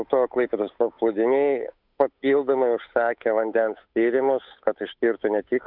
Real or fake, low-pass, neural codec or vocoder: fake; 5.4 kHz; codec, 16 kHz, 8 kbps, FunCodec, trained on Chinese and English, 25 frames a second